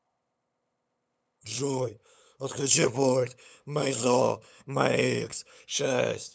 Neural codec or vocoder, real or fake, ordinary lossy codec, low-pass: codec, 16 kHz, 8 kbps, FunCodec, trained on LibriTTS, 25 frames a second; fake; none; none